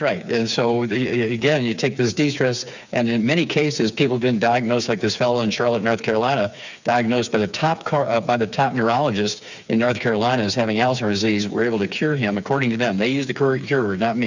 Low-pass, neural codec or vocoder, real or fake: 7.2 kHz; codec, 16 kHz, 4 kbps, FreqCodec, smaller model; fake